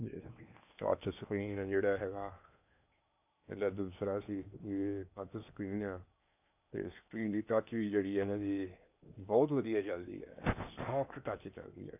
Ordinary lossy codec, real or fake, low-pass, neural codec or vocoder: none; fake; 3.6 kHz; codec, 16 kHz in and 24 kHz out, 0.8 kbps, FocalCodec, streaming, 65536 codes